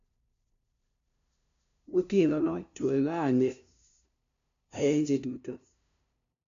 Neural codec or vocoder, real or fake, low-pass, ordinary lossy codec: codec, 16 kHz, 0.5 kbps, FunCodec, trained on LibriTTS, 25 frames a second; fake; 7.2 kHz; none